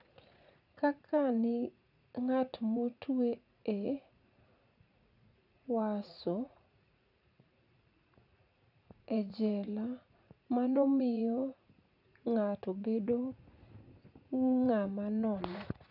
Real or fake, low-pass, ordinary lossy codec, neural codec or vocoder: fake; 5.4 kHz; none; vocoder, 44.1 kHz, 80 mel bands, Vocos